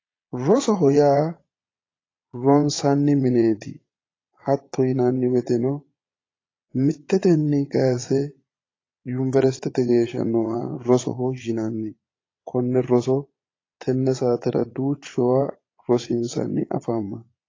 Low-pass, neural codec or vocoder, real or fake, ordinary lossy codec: 7.2 kHz; vocoder, 22.05 kHz, 80 mel bands, Vocos; fake; AAC, 32 kbps